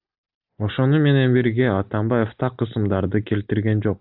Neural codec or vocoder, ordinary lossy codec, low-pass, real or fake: none; AAC, 48 kbps; 5.4 kHz; real